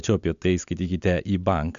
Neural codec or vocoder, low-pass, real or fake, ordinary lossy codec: none; 7.2 kHz; real; MP3, 64 kbps